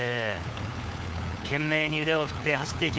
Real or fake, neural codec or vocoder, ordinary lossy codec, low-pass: fake; codec, 16 kHz, 4 kbps, FunCodec, trained on LibriTTS, 50 frames a second; none; none